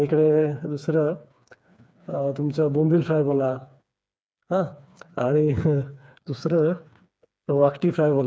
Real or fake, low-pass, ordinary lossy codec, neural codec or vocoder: fake; none; none; codec, 16 kHz, 4 kbps, FreqCodec, smaller model